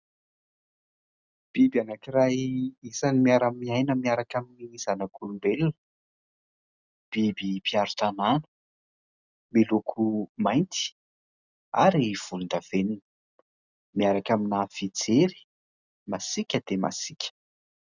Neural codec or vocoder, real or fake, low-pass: none; real; 7.2 kHz